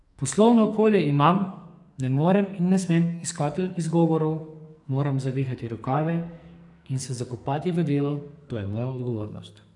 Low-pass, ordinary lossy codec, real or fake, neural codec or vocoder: 10.8 kHz; none; fake; codec, 44.1 kHz, 2.6 kbps, SNAC